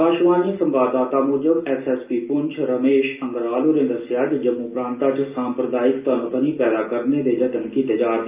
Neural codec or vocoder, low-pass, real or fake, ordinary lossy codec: none; 3.6 kHz; real; Opus, 24 kbps